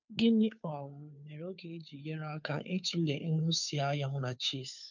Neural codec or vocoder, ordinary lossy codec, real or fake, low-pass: codec, 16 kHz, 2 kbps, FunCodec, trained on Chinese and English, 25 frames a second; none; fake; 7.2 kHz